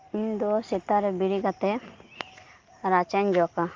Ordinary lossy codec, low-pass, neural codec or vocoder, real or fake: Opus, 32 kbps; 7.2 kHz; none; real